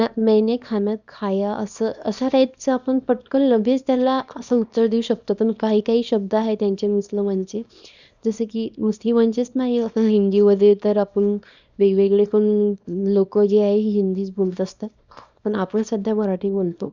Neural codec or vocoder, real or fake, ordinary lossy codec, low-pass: codec, 24 kHz, 0.9 kbps, WavTokenizer, small release; fake; none; 7.2 kHz